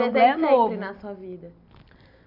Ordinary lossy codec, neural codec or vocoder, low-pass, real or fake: none; none; 5.4 kHz; real